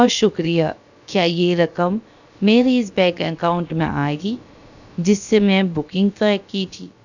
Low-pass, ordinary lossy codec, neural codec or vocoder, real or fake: 7.2 kHz; none; codec, 16 kHz, about 1 kbps, DyCAST, with the encoder's durations; fake